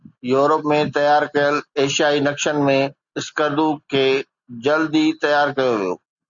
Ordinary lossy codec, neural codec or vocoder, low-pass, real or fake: Opus, 64 kbps; none; 7.2 kHz; real